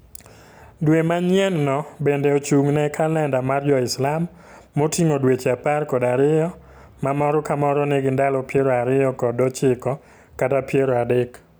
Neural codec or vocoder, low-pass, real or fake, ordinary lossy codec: none; none; real; none